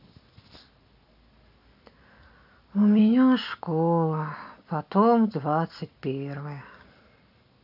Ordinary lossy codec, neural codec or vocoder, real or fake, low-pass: AAC, 48 kbps; none; real; 5.4 kHz